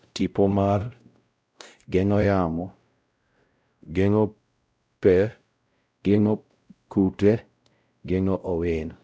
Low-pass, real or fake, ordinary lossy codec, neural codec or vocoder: none; fake; none; codec, 16 kHz, 0.5 kbps, X-Codec, WavLM features, trained on Multilingual LibriSpeech